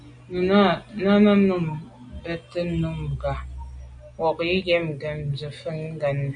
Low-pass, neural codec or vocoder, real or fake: 9.9 kHz; none; real